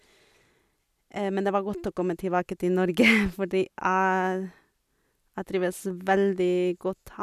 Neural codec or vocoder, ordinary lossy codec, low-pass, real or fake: none; none; 14.4 kHz; real